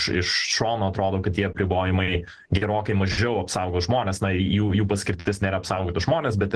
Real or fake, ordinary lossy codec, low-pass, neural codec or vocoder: real; Opus, 16 kbps; 10.8 kHz; none